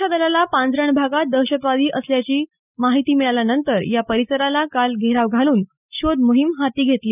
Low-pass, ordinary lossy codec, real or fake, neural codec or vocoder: 3.6 kHz; none; real; none